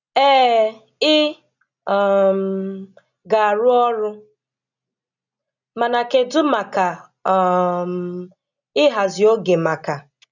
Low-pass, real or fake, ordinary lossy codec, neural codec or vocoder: 7.2 kHz; real; none; none